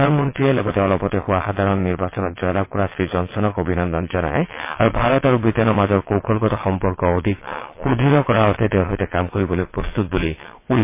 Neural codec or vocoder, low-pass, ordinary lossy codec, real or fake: vocoder, 22.05 kHz, 80 mel bands, WaveNeXt; 3.6 kHz; MP3, 24 kbps; fake